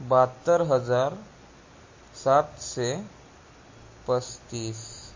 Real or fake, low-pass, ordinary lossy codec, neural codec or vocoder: real; 7.2 kHz; MP3, 32 kbps; none